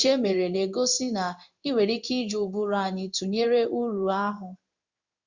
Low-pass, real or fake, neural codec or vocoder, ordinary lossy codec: 7.2 kHz; fake; codec, 16 kHz in and 24 kHz out, 1 kbps, XY-Tokenizer; Opus, 64 kbps